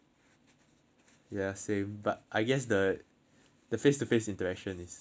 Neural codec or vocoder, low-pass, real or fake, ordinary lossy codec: none; none; real; none